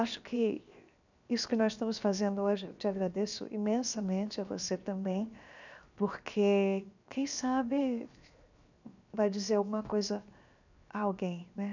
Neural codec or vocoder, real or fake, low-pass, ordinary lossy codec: codec, 16 kHz, 0.7 kbps, FocalCodec; fake; 7.2 kHz; none